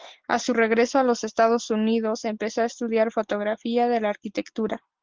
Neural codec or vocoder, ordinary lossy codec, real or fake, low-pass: none; Opus, 16 kbps; real; 7.2 kHz